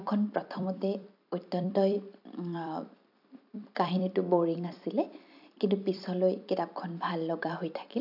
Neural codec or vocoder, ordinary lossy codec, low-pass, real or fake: vocoder, 44.1 kHz, 128 mel bands every 256 samples, BigVGAN v2; AAC, 48 kbps; 5.4 kHz; fake